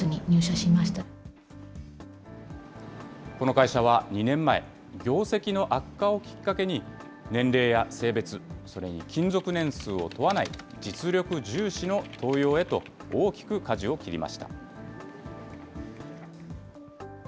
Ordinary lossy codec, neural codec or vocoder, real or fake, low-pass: none; none; real; none